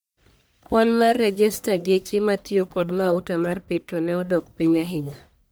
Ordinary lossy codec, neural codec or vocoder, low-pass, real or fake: none; codec, 44.1 kHz, 1.7 kbps, Pupu-Codec; none; fake